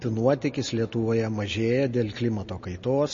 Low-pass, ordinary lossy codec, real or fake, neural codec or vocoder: 7.2 kHz; MP3, 32 kbps; real; none